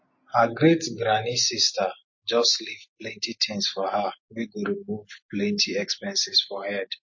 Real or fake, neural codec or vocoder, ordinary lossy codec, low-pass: real; none; MP3, 32 kbps; 7.2 kHz